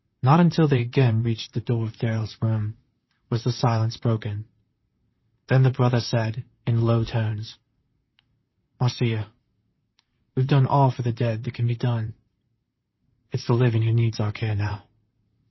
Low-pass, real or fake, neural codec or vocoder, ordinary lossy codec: 7.2 kHz; fake; vocoder, 44.1 kHz, 128 mel bands, Pupu-Vocoder; MP3, 24 kbps